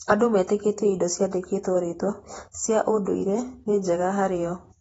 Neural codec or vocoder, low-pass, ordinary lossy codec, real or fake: vocoder, 48 kHz, 128 mel bands, Vocos; 19.8 kHz; AAC, 24 kbps; fake